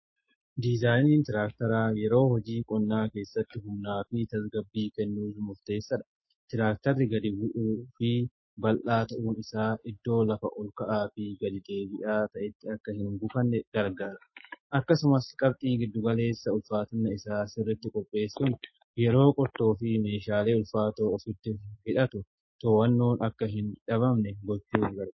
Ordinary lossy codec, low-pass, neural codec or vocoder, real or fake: MP3, 24 kbps; 7.2 kHz; autoencoder, 48 kHz, 128 numbers a frame, DAC-VAE, trained on Japanese speech; fake